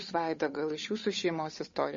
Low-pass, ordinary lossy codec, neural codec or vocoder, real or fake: 7.2 kHz; MP3, 32 kbps; codec, 16 kHz, 8 kbps, FunCodec, trained on Chinese and English, 25 frames a second; fake